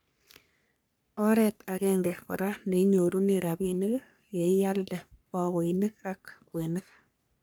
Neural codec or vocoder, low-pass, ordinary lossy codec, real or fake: codec, 44.1 kHz, 3.4 kbps, Pupu-Codec; none; none; fake